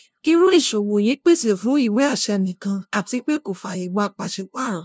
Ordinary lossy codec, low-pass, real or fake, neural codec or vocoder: none; none; fake; codec, 16 kHz, 0.5 kbps, FunCodec, trained on LibriTTS, 25 frames a second